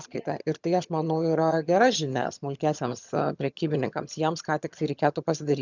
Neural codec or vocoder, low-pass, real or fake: vocoder, 22.05 kHz, 80 mel bands, HiFi-GAN; 7.2 kHz; fake